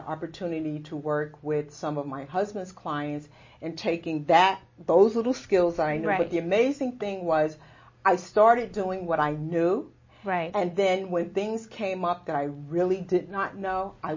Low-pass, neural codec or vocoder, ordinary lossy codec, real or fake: 7.2 kHz; none; MP3, 32 kbps; real